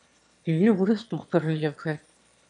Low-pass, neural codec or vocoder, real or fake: 9.9 kHz; autoencoder, 22.05 kHz, a latent of 192 numbers a frame, VITS, trained on one speaker; fake